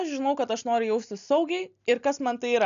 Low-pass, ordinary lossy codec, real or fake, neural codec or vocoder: 7.2 kHz; AAC, 96 kbps; real; none